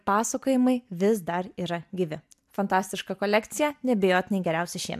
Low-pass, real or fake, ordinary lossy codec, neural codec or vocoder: 14.4 kHz; real; MP3, 96 kbps; none